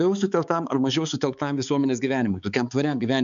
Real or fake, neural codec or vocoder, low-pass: fake; codec, 16 kHz, 4 kbps, X-Codec, HuBERT features, trained on balanced general audio; 7.2 kHz